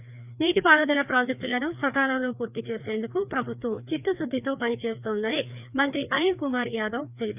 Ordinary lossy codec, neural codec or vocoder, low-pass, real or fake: none; codec, 16 kHz, 2 kbps, FreqCodec, larger model; 3.6 kHz; fake